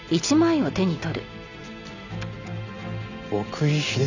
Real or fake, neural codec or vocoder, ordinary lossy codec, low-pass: real; none; none; 7.2 kHz